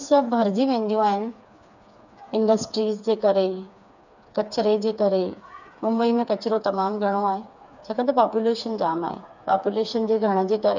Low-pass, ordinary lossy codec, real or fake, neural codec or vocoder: 7.2 kHz; none; fake; codec, 16 kHz, 4 kbps, FreqCodec, smaller model